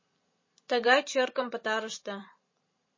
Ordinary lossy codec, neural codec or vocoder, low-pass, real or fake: MP3, 32 kbps; vocoder, 44.1 kHz, 128 mel bands every 512 samples, BigVGAN v2; 7.2 kHz; fake